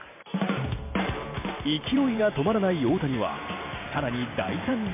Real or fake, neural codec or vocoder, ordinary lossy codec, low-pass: fake; vocoder, 44.1 kHz, 128 mel bands every 512 samples, BigVGAN v2; MP3, 24 kbps; 3.6 kHz